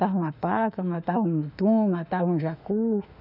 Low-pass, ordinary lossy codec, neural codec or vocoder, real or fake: 5.4 kHz; none; autoencoder, 48 kHz, 32 numbers a frame, DAC-VAE, trained on Japanese speech; fake